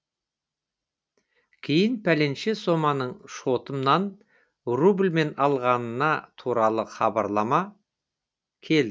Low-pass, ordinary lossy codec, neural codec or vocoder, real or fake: none; none; none; real